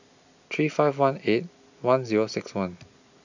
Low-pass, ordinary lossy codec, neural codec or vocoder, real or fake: 7.2 kHz; none; none; real